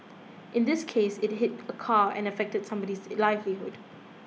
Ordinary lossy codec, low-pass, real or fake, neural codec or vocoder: none; none; real; none